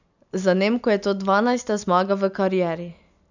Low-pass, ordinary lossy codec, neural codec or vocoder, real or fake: 7.2 kHz; none; none; real